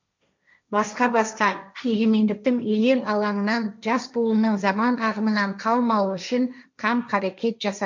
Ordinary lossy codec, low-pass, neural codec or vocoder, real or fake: MP3, 64 kbps; 7.2 kHz; codec, 16 kHz, 1.1 kbps, Voila-Tokenizer; fake